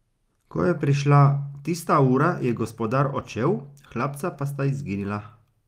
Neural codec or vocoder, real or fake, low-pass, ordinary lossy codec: none; real; 14.4 kHz; Opus, 32 kbps